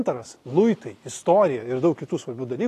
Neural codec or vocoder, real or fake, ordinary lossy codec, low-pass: none; real; AAC, 48 kbps; 14.4 kHz